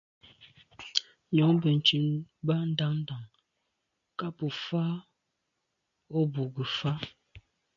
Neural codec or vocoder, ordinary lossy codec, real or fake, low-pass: none; MP3, 64 kbps; real; 7.2 kHz